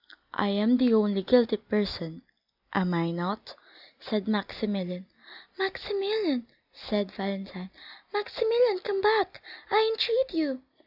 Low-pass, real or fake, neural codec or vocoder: 5.4 kHz; real; none